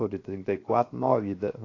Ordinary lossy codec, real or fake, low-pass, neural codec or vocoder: AAC, 32 kbps; fake; 7.2 kHz; codec, 16 kHz, 0.7 kbps, FocalCodec